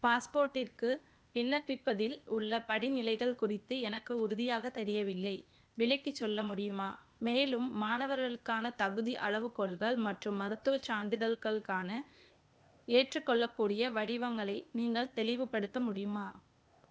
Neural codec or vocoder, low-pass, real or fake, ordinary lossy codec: codec, 16 kHz, 0.8 kbps, ZipCodec; none; fake; none